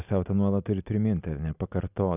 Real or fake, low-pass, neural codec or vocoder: real; 3.6 kHz; none